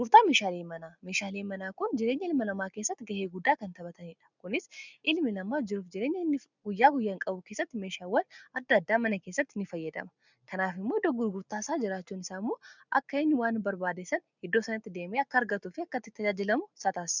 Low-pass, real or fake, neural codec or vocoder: 7.2 kHz; real; none